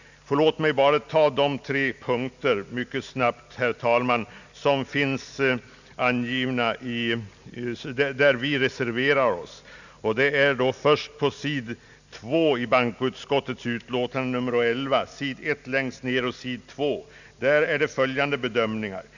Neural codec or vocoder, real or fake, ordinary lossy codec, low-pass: none; real; none; 7.2 kHz